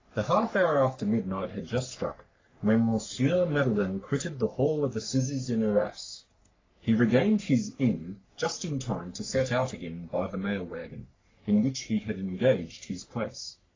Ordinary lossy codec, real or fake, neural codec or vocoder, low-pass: AAC, 32 kbps; fake; codec, 44.1 kHz, 3.4 kbps, Pupu-Codec; 7.2 kHz